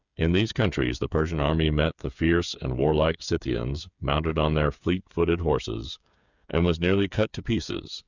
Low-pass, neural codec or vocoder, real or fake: 7.2 kHz; codec, 16 kHz, 8 kbps, FreqCodec, smaller model; fake